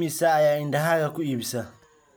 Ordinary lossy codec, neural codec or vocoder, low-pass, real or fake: none; none; none; real